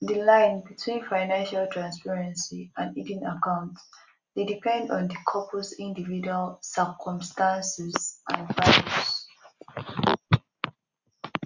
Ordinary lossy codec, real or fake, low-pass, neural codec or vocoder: Opus, 64 kbps; real; 7.2 kHz; none